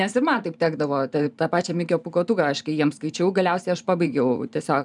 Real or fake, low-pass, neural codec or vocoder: fake; 10.8 kHz; vocoder, 44.1 kHz, 128 mel bands every 512 samples, BigVGAN v2